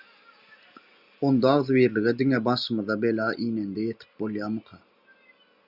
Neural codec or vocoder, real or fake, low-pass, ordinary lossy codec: none; real; 5.4 kHz; Opus, 64 kbps